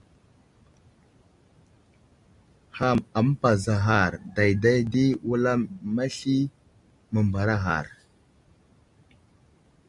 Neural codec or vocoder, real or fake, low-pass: none; real; 10.8 kHz